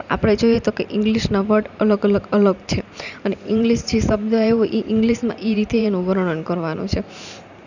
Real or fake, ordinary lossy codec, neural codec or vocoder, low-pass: fake; none; vocoder, 44.1 kHz, 128 mel bands every 512 samples, BigVGAN v2; 7.2 kHz